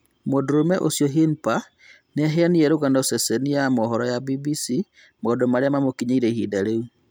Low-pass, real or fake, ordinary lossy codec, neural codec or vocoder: none; real; none; none